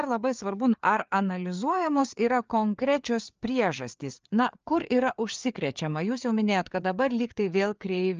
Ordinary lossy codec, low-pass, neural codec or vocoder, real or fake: Opus, 24 kbps; 7.2 kHz; codec, 16 kHz, 4 kbps, FreqCodec, larger model; fake